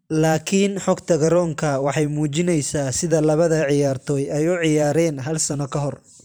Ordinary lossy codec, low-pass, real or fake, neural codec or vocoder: none; none; fake; vocoder, 44.1 kHz, 128 mel bands every 512 samples, BigVGAN v2